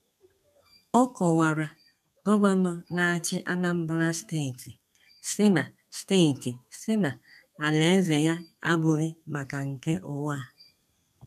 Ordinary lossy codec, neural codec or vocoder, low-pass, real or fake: none; codec, 32 kHz, 1.9 kbps, SNAC; 14.4 kHz; fake